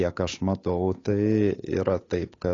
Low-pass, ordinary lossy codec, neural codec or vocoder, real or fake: 7.2 kHz; AAC, 32 kbps; codec, 16 kHz, 8 kbps, FunCodec, trained on Chinese and English, 25 frames a second; fake